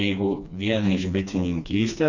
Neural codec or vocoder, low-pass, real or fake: codec, 16 kHz, 2 kbps, FreqCodec, smaller model; 7.2 kHz; fake